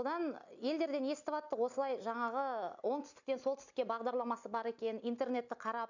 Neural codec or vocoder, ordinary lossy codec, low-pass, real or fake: none; none; 7.2 kHz; real